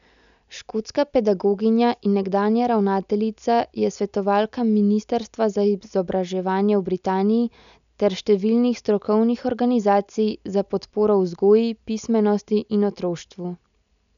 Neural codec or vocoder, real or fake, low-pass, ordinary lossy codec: none; real; 7.2 kHz; none